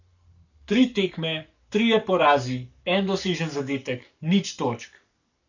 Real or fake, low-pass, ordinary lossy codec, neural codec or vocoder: fake; 7.2 kHz; none; codec, 44.1 kHz, 7.8 kbps, Pupu-Codec